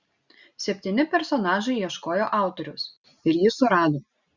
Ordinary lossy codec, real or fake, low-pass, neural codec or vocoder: Opus, 64 kbps; real; 7.2 kHz; none